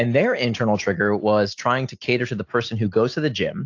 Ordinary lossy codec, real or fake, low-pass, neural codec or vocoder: AAC, 48 kbps; real; 7.2 kHz; none